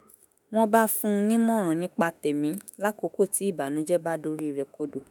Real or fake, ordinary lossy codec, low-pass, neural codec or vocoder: fake; none; none; autoencoder, 48 kHz, 32 numbers a frame, DAC-VAE, trained on Japanese speech